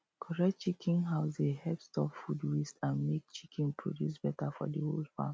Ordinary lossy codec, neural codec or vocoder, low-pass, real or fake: none; none; none; real